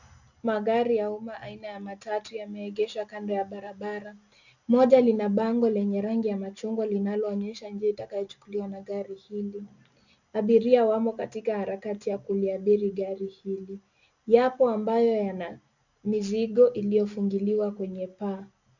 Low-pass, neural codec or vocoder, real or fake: 7.2 kHz; none; real